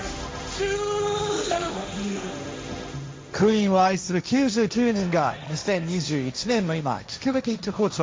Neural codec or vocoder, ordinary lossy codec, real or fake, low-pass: codec, 16 kHz, 1.1 kbps, Voila-Tokenizer; none; fake; none